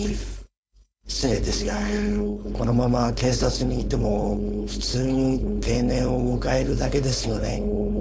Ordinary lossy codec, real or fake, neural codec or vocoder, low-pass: none; fake; codec, 16 kHz, 4.8 kbps, FACodec; none